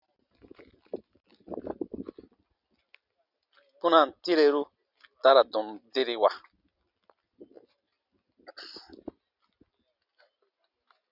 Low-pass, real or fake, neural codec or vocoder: 5.4 kHz; real; none